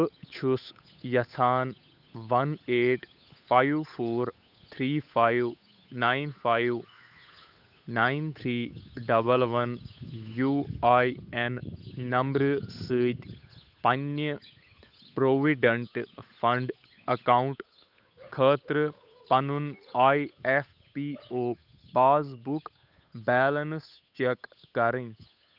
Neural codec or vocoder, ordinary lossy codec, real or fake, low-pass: codec, 16 kHz, 8 kbps, FunCodec, trained on Chinese and English, 25 frames a second; none; fake; 5.4 kHz